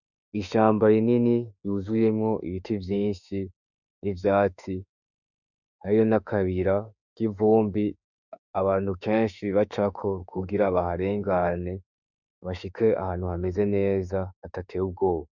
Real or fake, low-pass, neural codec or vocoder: fake; 7.2 kHz; autoencoder, 48 kHz, 32 numbers a frame, DAC-VAE, trained on Japanese speech